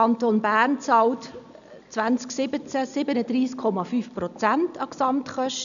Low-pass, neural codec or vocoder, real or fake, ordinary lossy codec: 7.2 kHz; none; real; none